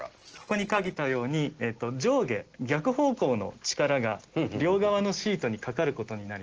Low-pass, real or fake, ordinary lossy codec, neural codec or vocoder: 7.2 kHz; real; Opus, 16 kbps; none